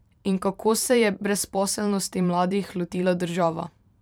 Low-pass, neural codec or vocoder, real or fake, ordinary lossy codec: none; vocoder, 44.1 kHz, 128 mel bands every 512 samples, BigVGAN v2; fake; none